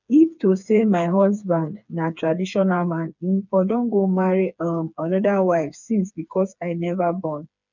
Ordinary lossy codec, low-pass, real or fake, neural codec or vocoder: none; 7.2 kHz; fake; codec, 16 kHz, 4 kbps, FreqCodec, smaller model